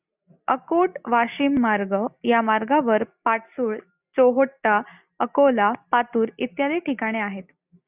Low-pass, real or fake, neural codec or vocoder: 3.6 kHz; real; none